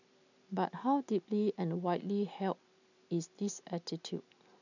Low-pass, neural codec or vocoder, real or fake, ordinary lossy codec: 7.2 kHz; none; real; none